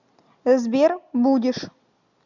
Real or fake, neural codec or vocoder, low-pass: real; none; 7.2 kHz